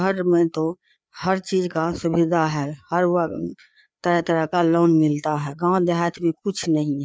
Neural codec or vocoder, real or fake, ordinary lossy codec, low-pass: codec, 16 kHz, 4 kbps, FreqCodec, larger model; fake; none; none